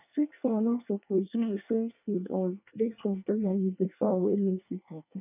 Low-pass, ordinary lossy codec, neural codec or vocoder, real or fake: 3.6 kHz; none; codec, 24 kHz, 1 kbps, SNAC; fake